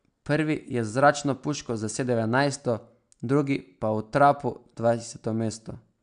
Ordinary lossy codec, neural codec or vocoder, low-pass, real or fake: AAC, 96 kbps; none; 9.9 kHz; real